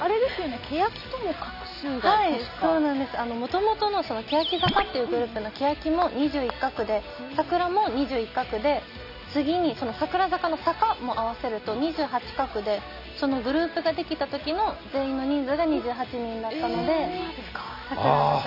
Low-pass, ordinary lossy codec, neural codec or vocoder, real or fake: 5.4 kHz; none; none; real